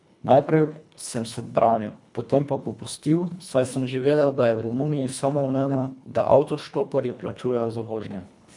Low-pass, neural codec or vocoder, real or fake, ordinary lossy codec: 10.8 kHz; codec, 24 kHz, 1.5 kbps, HILCodec; fake; none